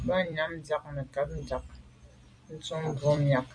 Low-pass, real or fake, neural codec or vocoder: 9.9 kHz; fake; vocoder, 44.1 kHz, 128 mel bands every 256 samples, BigVGAN v2